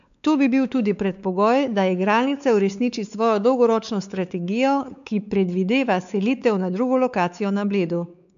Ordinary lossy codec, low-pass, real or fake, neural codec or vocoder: none; 7.2 kHz; fake; codec, 16 kHz, 4 kbps, X-Codec, WavLM features, trained on Multilingual LibriSpeech